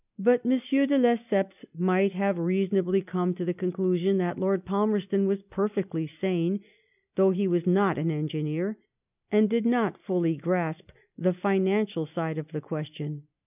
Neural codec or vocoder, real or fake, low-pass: none; real; 3.6 kHz